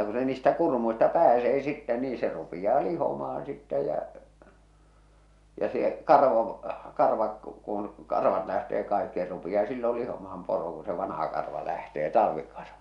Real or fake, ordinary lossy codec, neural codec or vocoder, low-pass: real; MP3, 96 kbps; none; 10.8 kHz